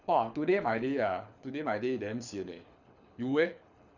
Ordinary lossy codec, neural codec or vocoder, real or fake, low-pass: none; codec, 24 kHz, 6 kbps, HILCodec; fake; 7.2 kHz